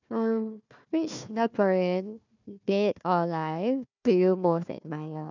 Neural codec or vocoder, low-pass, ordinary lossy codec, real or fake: codec, 16 kHz, 1 kbps, FunCodec, trained on Chinese and English, 50 frames a second; 7.2 kHz; none; fake